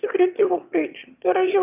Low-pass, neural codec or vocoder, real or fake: 3.6 kHz; autoencoder, 22.05 kHz, a latent of 192 numbers a frame, VITS, trained on one speaker; fake